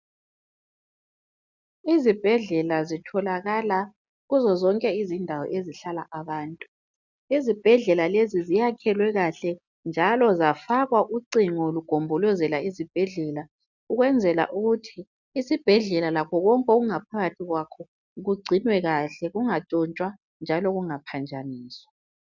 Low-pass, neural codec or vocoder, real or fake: 7.2 kHz; none; real